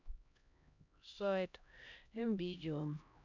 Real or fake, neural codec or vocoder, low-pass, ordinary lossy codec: fake; codec, 16 kHz, 1 kbps, X-Codec, HuBERT features, trained on LibriSpeech; 7.2 kHz; none